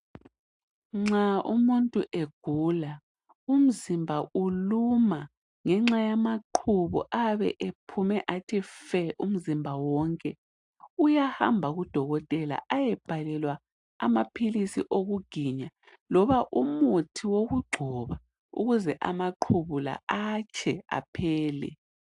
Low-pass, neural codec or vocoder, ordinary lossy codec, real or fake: 10.8 kHz; none; AAC, 64 kbps; real